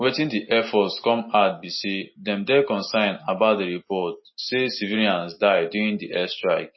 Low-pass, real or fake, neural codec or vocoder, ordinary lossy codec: 7.2 kHz; real; none; MP3, 24 kbps